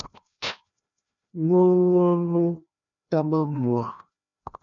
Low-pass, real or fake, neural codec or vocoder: 7.2 kHz; fake; codec, 16 kHz, 1 kbps, FreqCodec, larger model